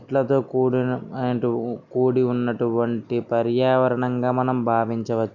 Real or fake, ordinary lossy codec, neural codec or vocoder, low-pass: real; none; none; 7.2 kHz